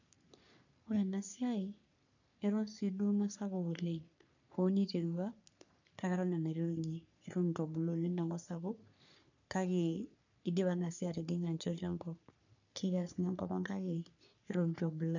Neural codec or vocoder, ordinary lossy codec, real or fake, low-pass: codec, 44.1 kHz, 3.4 kbps, Pupu-Codec; none; fake; 7.2 kHz